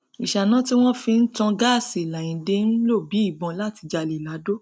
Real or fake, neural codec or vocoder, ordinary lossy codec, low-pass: real; none; none; none